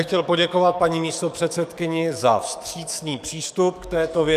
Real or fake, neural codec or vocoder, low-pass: fake; codec, 44.1 kHz, 7.8 kbps, DAC; 14.4 kHz